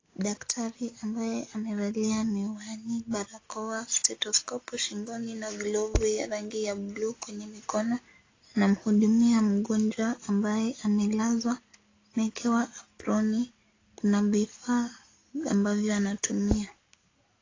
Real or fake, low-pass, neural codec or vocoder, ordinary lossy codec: real; 7.2 kHz; none; AAC, 32 kbps